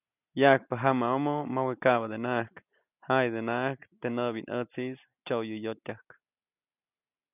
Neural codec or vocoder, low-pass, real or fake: none; 3.6 kHz; real